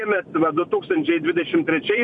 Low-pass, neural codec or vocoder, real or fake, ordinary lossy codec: 10.8 kHz; vocoder, 44.1 kHz, 128 mel bands every 256 samples, BigVGAN v2; fake; MP3, 64 kbps